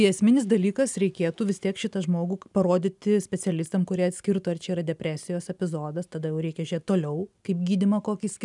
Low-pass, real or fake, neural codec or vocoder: 10.8 kHz; real; none